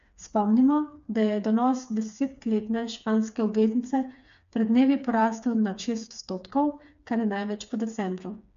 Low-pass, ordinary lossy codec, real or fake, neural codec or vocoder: 7.2 kHz; AAC, 96 kbps; fake; codec, 16 kHz, 4 kbps, FreqCodec, smaller model